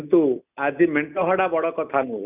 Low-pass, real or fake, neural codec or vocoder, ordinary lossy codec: 3.6 kHz; real; none; none